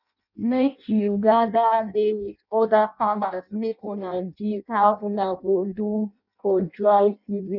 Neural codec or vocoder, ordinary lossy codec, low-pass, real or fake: codec, 16 kHz in and 24 kHz out, 0.6 kbps, FireRedTTS-2 codec; none; 5.4 kHz; fake